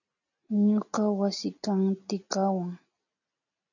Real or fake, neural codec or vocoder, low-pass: real; none; 7.2 kHz